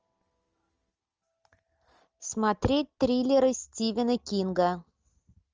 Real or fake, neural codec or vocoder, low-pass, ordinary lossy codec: real; none; 7.2 kHz; Opus, 24 kbps